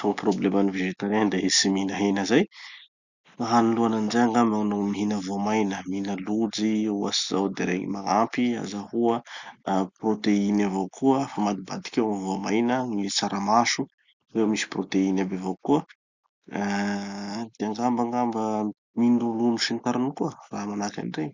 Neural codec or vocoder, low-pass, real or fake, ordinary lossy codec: none; 7.2 kHz; real; Opus, 64 kbps